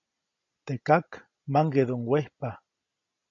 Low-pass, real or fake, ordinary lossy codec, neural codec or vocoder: 7.2 kHz; real; AAC, 48 kbps; none